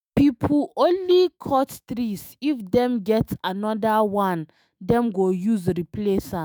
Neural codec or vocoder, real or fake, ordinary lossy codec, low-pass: autoencoder, 48 kHz, 128 numbers a frame, DAC-VAE, trained on Japanese speech; fake; none; none